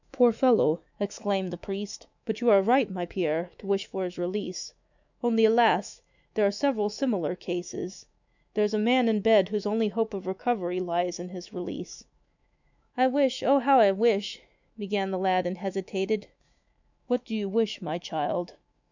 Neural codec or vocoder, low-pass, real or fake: autoencoder, 48 kHz, 128 numbers a frame, DAC-VAE, trained on Japanese speech; 7.2 kHz; fake